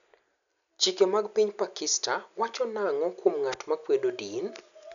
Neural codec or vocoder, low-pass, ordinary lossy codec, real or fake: none; 7.2 kHz; none; real